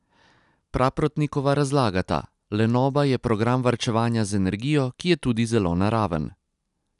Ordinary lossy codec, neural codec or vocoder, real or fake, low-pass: none; none; real; 10.8 kHz